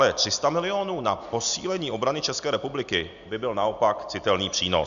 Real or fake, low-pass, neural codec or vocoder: real; 7.2 kHz; none